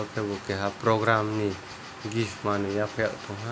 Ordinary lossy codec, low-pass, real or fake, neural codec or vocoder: none; none; real; none